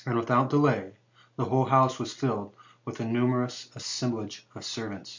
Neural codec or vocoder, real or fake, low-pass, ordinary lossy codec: none; real; 7.2 kHz; MP3, 64 kbps